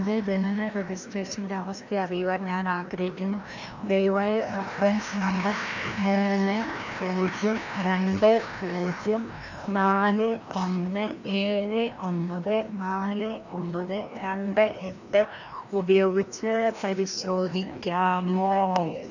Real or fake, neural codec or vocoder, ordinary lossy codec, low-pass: fake; codec, 16 kHz, 1 kbps, FreqCodec, larger model; none; 7.2 kHz